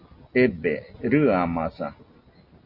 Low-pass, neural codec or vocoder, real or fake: 5.4 kHz; none; real